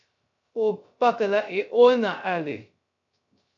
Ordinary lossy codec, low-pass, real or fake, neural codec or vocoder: MP3, 96 kbps; 7.2 kHz; fake; codec, 16 kHz, 0.3 kbps, FocalCodec